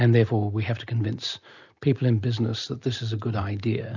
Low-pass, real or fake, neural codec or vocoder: 7.2 kHz; real; none